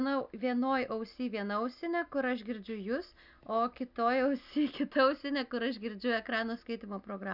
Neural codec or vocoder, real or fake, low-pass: none; real; 5.4 kHz